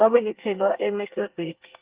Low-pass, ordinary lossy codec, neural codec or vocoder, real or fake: 3.6 kHz; Opus, 32 kbps; codec, 24 kHz, 1 kbps, SNAC; fake